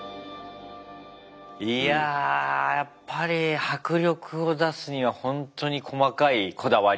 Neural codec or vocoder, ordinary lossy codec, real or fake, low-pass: none; none; real; none